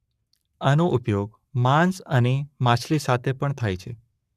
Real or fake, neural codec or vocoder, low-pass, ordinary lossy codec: fake; codec, 44.1 kHz, 7.8 kbps, Pupu-Codec; 14.4 kHz; none